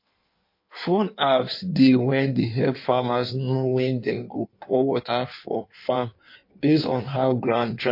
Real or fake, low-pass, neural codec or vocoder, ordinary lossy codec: fake; 5.4 kHz; codec, 16 kHz in and 24 kHz out, 1.1 kbps, FireRedTTS-2 codec; MP3, 32 kbps